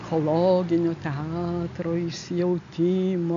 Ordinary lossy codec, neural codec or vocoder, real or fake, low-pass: AAC, 64 kbps; none; real; 7.2 kHz